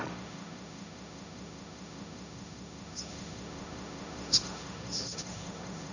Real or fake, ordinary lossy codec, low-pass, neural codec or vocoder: fake; none; 7.2 kHz; codec, 16 kHz, 1.1 kbps, Voila-Tokenizer